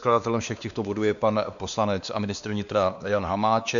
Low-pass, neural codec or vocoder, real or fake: 7.2 kHz; codec, 16 kHz, 2 kbps, X-Codec, WavLM features, trained on Multilingual LibriSpeech; fake